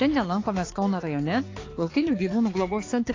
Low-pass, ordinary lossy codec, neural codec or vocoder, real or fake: 7.2 kHz; AAC, 32 kbps; codec, 16 kHz, 4 kbps, X-Codec, HuBERT features, trained on balanced general audio; fake